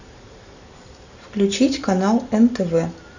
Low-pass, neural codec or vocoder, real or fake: 7.2 kHz; none; real